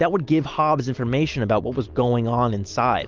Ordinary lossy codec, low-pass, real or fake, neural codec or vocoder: Opus, 32 kbps; 7.2 kHz; real; none